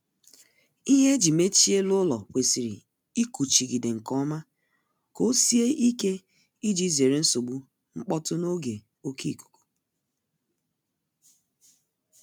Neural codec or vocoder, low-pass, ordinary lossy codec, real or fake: none; none; none; real